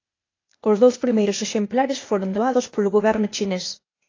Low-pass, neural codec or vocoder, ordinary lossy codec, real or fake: 7.2 kHz; codec, 16 kHz, 0.8 kbps, ZipCodec; AAC, 32 kbps; fake